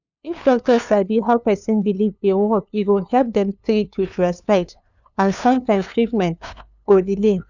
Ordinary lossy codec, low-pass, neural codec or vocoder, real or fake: none; 7.2 kHz; codec, 16 kHz, 2 kbps, FunCodec, trained on LibriTTS, 25 frames a second; fake